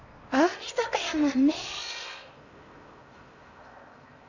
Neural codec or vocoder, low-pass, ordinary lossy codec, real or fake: codec, 16 kHz in and 24 kHz out, 0.8 kbps, FocalCodec, streaming, 65536 codes; 7.2 kHz; none; fake